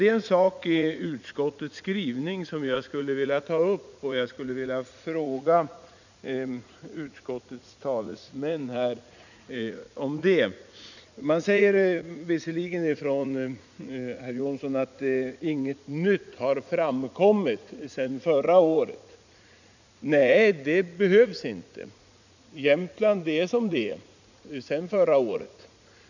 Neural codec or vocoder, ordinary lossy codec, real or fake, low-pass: vocoder, 44.1 kHz, 80 mel bands, Vocos; none; fake; 7.2 kHz